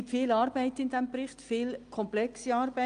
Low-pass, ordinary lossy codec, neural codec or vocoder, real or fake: 9.9 kHz; Opus, 32 kbps; none; real